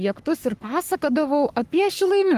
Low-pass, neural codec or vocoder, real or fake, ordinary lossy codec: 14.4 kHz; codec, 44.1 kHz, 3.4 kbps, Pupu-Codec; fake; Opus, 24 kbps